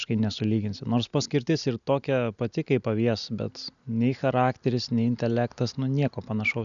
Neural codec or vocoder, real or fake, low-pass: none; real; 7.2 kHz